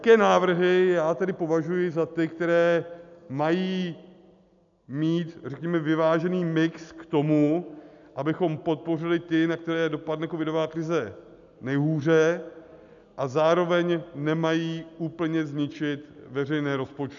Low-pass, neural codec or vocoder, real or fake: 7.2 kHz; none; real